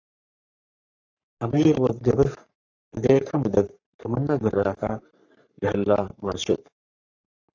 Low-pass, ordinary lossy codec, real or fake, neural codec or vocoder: 7.2 kHz; AAC, 48 kbps; fake; codec, 44.1 kHz, 3.4 kbps, Pupu-Codec